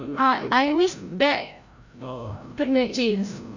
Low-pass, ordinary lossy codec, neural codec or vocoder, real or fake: 7.2 kHz; none; codec, 16 kHz, 0.5 kbps, FreqCodec, larger model; fake